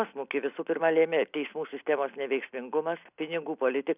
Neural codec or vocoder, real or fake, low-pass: none; real; 3.6 kHz